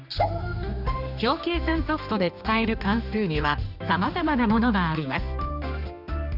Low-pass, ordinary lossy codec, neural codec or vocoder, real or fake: 5.4 kHz; none; codec, 16 kHz, 2 kbps, X-Codec, HuBERT features, trained on general audio; fake